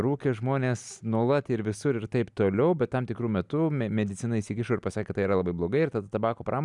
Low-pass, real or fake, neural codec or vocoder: 10.8 kHz; real; none